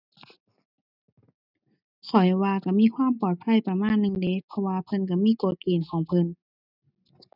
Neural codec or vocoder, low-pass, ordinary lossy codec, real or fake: none; 5.4 kHz; none; real